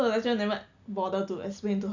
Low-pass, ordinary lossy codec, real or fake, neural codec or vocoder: 7.2 kHz; none; real; none